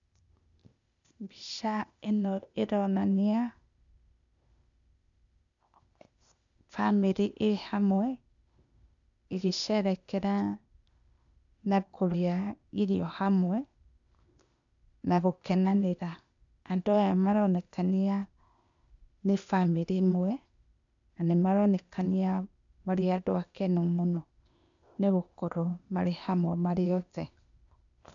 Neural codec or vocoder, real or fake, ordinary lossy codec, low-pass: codec, 16 kHz, 0.8 kbps, ZipCodec; fake; none; 7.2 kHz